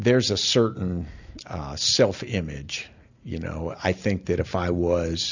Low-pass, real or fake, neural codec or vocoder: 7.2 kHz; real; none